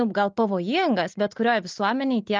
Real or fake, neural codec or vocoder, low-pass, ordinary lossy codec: real; none; 7.2 kHz; Opus, 32 kbps